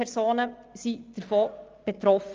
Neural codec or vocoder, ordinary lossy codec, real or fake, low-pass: none; Opus, 24 kbps; real; 7.2 kHz